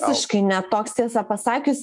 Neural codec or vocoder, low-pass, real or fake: autoencoder, 48 kHz, 128 numbers a frame, DAC-VAE, trained on Japanese speech; 10.8 kHz; fake